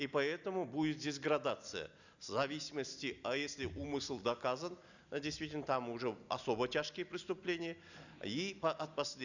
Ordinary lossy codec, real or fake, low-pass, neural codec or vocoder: none; real; 7.2 kHz; none